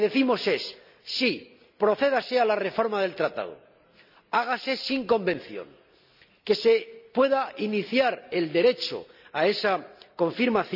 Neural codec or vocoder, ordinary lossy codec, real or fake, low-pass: none; none; real; 5.4 kHz